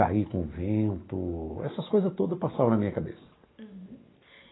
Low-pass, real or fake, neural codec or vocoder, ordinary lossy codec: 7.2 kHz; real; none; AAC, 16 kbps